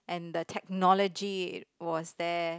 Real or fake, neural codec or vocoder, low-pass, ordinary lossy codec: real; none; none; none